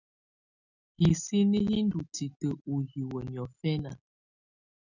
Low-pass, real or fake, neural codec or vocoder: 7.2 kHz; real; none